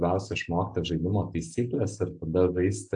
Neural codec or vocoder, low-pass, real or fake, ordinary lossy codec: none; 9.9 kHz; real; AAC, 64 kbps